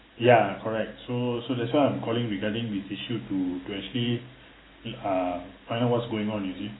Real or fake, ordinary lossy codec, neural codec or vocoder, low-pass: real; AAC, 16 kbps; none; 7.2 kHz